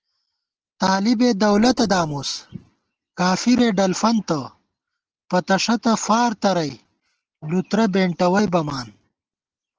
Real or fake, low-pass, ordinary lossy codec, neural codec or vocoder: real; 7.2 kHz; Opus, 16 kbps; none